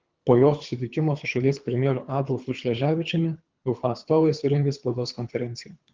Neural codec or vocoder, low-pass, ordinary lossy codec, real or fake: codec, 24 kHz, 3 kbps, HILCodec; 7.2 kHz; Opus, 32 kbps; fake